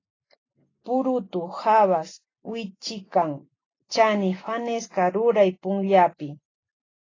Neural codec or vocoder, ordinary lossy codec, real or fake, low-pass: none; AAC, 32 kbps; real; 7.2 kHz